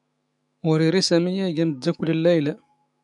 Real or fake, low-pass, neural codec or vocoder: fake; 10.8 kHz; autoencoder, 48 kHz, 128 numbers a frame, DAC-VAE, trained on Japanese speech